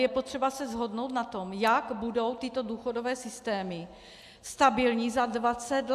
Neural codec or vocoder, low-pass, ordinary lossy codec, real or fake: none; 14.4 kHz; AAC, 96 kbps; real